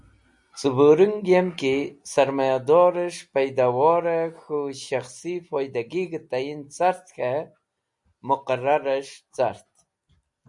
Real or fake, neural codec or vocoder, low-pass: real; none; 10.8 kHz